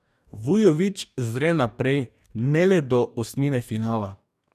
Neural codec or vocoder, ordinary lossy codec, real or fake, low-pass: codec, 44.1 kHz, 2.6 kbps, DAC; none; fake; 14.4 kHz